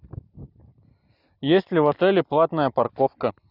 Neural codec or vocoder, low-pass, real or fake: none; 5.4 kHz; real